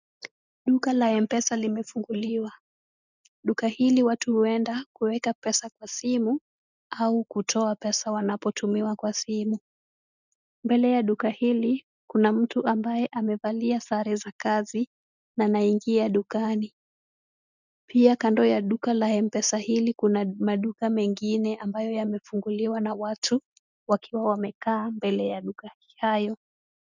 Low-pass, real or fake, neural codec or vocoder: 7.2 kHz; real; none